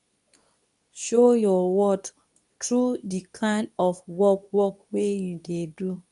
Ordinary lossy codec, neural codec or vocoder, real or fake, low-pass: none; codec, 24 kHz, 0.9 kbps, WavTokenizer, medium speech release version 2; fake; 10.8 kHz